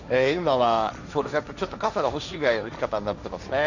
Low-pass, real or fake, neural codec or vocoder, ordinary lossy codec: 7.2 kHz; fake; codec, 16 kHz, 1.1 kbps, Voila-Tokenizer; none